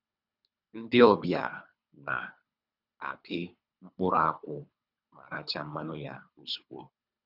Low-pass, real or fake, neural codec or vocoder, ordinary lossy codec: 5.4 kHz; fake; codec, 24 kHz, 3 kbps, HILCodec; none